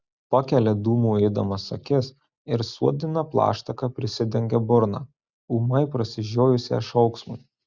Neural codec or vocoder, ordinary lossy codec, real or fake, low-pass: none; Opus, 64 kbps; real; 7.2 kHz